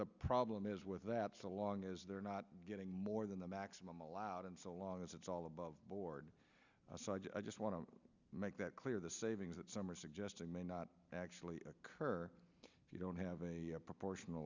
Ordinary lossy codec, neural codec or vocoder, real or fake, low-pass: Opus, 64 kbps; none; real; 7.2 kHz